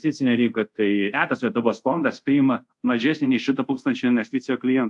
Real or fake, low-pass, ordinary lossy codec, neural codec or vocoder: fake; 10.8 kHz; AAC, 64 kbps; codec, 24 kHz, 0.5 kbps, DualCodec